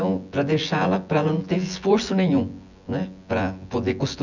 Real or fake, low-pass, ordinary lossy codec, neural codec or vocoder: fake; 7.2 kHz; none; vocoder, 24 kHz, 100 mel bands, Vocos